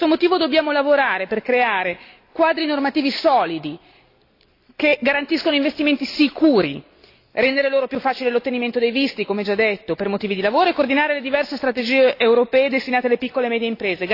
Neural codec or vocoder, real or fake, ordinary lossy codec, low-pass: none; real; AAC, 32 kbps; 5.4 kHz